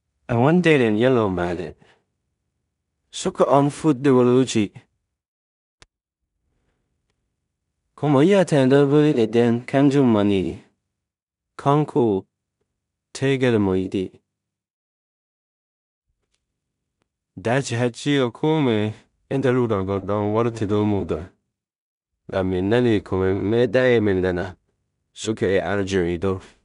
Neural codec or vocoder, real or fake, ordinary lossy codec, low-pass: codec, 16 kHz in and 24 kHz out, 0.4 kbps, LongCat-Audio-Codec, two codebook decoder; fake; none; 10.8 kHz